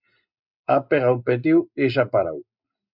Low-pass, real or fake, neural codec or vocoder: 5.4 kHz; real; none